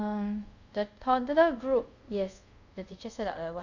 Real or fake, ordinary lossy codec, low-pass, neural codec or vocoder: fake; none; 7.2 kHz; codec, 24 kHz, 0.5 kbps, DualCodec